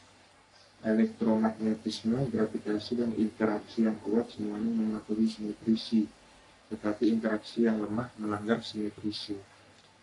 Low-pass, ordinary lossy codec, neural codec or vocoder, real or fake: 10.8 kHz; AAC, 64 kbps; codec, 44.1 kHz, 3.4 kbps, Pupu-Codec; fake